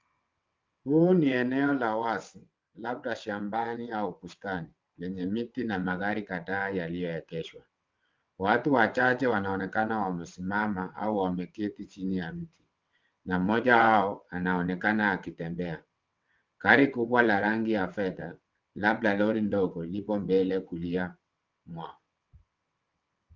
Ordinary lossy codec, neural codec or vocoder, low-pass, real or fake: Opus, 24 kbps; vocoder, 22.05 kHz, 80 mel bands, WaveNeXt; 7.2 kHz; fake